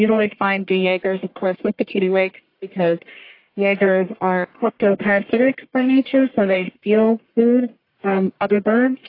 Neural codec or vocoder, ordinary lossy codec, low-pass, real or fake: codec, 44.1 kHz, 1.7 kbps, Pupu-Codec; AAC, 32 kbps; 5.4 kHz; fake